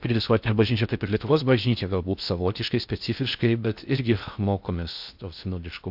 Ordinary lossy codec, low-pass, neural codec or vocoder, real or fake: AAC, 48 kbps; 5.4 kHz; codec, 16 kHz in and 24 kHz out, 0.6 kbps, FocalCodec, streaming, 4096 codes; fake